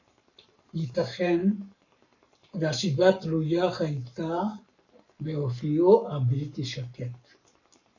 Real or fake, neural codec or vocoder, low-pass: fake; codec, 44.1 kHz, 7.8 kbps, Pupu-Codec; 7.2 kHz